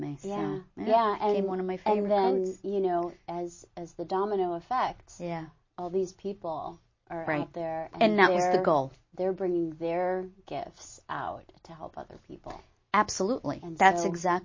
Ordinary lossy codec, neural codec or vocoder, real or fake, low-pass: MP3, 32 kbps; none; real; 7.2 kHz